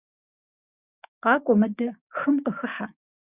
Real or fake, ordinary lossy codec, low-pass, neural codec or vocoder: fake; Opus, 64 kbps; 3.6 kHz; codec, 16 kHz, 6 kbps, DAC